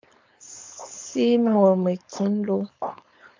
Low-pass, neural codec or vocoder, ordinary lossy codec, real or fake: 7.2 kHz; codec, 16 kHz, 4.8 kbps, FACodec; MP3, 64 kbps; fake